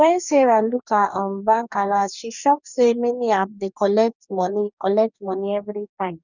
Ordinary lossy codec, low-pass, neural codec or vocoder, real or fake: none; 7.2 kHz; codec, 44.1 kHz, 2.6 kbps, DAC; fake